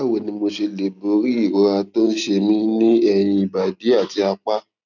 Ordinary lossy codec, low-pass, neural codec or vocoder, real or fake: none; 7.2 kHz; none; real